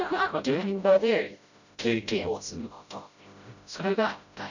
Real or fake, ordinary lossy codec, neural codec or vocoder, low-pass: fake; none; codec, 16 kHz, 0.5 kbps, FreqCodec, smaller model; 7.2 kHz